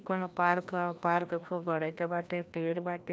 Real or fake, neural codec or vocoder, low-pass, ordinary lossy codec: fake; codec, 16 kHz, 1 kbps, FreqCodec, larger model; none; none